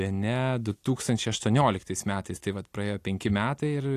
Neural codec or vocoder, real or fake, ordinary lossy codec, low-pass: none; real; AAC, 64 kbps; 14.4 kHz